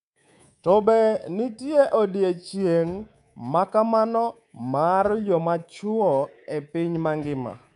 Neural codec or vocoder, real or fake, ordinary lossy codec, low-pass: codec, 24 kHz, 3.1 kbps, DualCodec; fake; none; 10.8 kHz